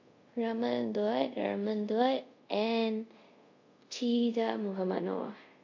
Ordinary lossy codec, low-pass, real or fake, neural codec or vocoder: MP3, 48 kbps; 7.2 kHz; fake; codec, 24 kHz, 0.5 kbps, DualCodec